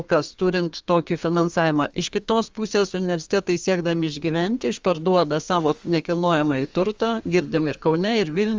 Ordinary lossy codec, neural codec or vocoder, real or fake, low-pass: Opus, 32 kbps; codec, 32 kHz, 1.9 kbps, SNAC; fake; 7.2 kHz